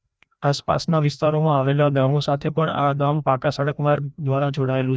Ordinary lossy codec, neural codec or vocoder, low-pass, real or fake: none; codec, 16 kHz, 1 kbps, FreqCodec, larger model; none; fake